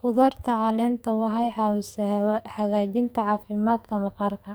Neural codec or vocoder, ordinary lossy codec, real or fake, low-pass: codec, 44.1 kHz, 2.6 kbps, SNAC; none; fake; none